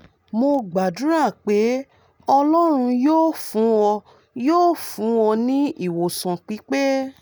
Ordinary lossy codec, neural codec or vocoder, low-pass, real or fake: none; none; none; real